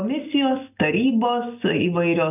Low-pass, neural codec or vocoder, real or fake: 3.6 kHz; none; real